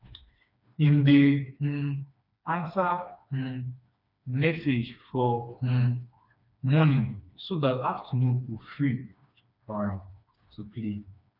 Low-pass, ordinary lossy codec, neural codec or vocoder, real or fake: 5.4 kHz; none; codec, 16 kHz, 2 kbps, FreqCodec, smaller model; fake